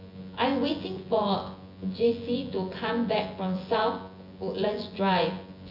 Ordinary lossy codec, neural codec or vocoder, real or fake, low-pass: Opus, 64 kbps; vocoder, 24 kHz, 100 mel bands, Vocos; fake; 5.4 kHz